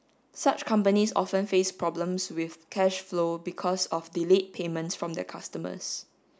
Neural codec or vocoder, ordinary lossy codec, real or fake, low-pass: none; none; real; none